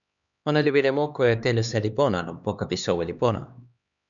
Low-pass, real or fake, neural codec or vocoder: 7.2 kHz; fake; codec, 16 kHz, 2 kbps, X-Codec, HuBERT features, trained on LibriSpeech